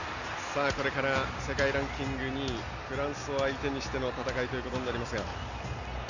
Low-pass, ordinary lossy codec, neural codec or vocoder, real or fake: 7.2 kHz; none; none; real